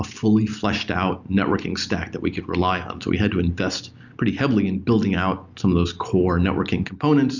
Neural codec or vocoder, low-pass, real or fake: none; 7.2 kHz; real